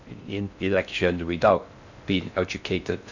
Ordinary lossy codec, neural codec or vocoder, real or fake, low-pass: none; codec, 16 kHz in and 24 kHz out, 0.6 kbps, FocalCodec, streaming, 2048 codes; fake; 7.2 kHz